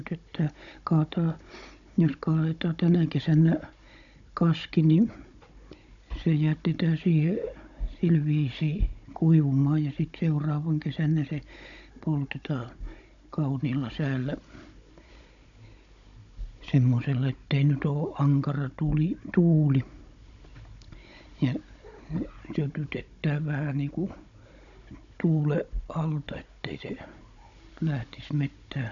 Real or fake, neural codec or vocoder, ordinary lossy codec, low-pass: fake; codec, 16 kHz, 16 kbps, FunCodec, trained on Chinese and English, 50 frames a second; none; 7.2 kHz